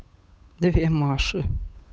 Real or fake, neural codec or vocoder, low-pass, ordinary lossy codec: fake; codec, 16 kHz, 8 kbps, FunCodec, trained on Chinese and English, 25 frames a second; none; none